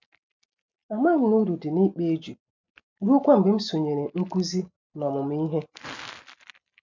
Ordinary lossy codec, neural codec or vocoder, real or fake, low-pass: MP3, 64 kbps; none; real; 7.2 kHz